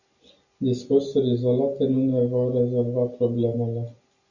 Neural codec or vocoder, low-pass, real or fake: none; 7.2 kHz; real